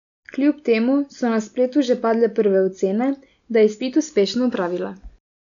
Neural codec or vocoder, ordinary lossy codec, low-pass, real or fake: none; none; 7.2 kHz; real